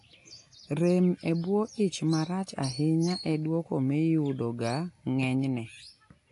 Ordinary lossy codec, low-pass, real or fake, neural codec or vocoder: AAC, 48 kbps; 10.8 kHz; real; none